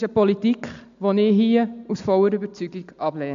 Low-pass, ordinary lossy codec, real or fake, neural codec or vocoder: 7.2 kHz; AAC, 64 kbps; real; none